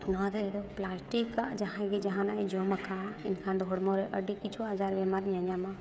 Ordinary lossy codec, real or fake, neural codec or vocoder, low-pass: none; fake; codec, 16 kHz, 16 kbps, FreqCodec, smaller model; none